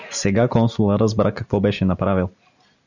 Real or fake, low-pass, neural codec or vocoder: real; 7.2 kHz; none